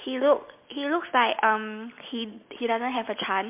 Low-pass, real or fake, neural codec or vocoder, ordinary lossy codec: 3.6 kHz; real; none; MP3, 32 kbps